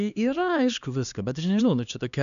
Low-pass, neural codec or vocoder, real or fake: 7.2 kHz; codec, 16 kHz, 2 kbps, X-Codec, HuBERT features, trained on LibriSpeech; fake